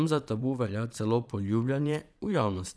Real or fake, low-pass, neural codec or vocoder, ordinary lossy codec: fake; none; vocoder, 22.05 kHz, 80 mel bands, Vocos; none